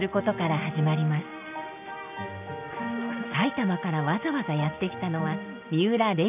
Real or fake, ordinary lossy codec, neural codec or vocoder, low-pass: real; none; none; 3.6 kHz